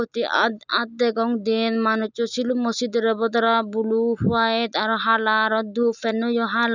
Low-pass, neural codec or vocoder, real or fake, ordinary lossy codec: 7.2 kHz; none; real; none